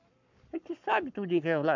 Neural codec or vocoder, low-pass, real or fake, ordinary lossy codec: codec, 44.1 kHz, 7.8 kbps, Pupu-Codec; 7.2 kHz; fake; none